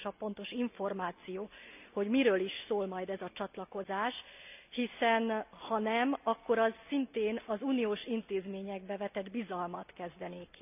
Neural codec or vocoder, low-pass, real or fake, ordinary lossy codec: none; 3.6 kHz; real; none